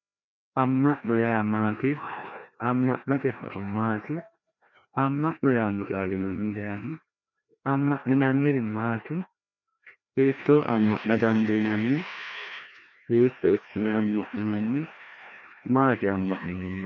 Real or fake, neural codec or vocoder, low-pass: fake; codec, 16 kHz, 1 kbps, FreqCodec, larger model; 7.2 kHz